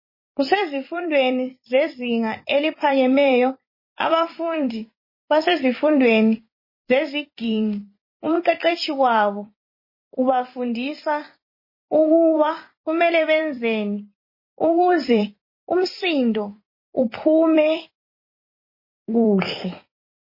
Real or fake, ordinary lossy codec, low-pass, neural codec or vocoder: fake; MP3, 24 kbps; 5.4 kHz; vocoder, 44.1 kHz, 128 mel bands every 256 samples, BigVGAN v2